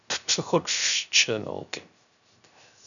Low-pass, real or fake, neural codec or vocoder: 7.2 kHz; fake; codec, 16 kHz, 0.3 kbps, FocalCodec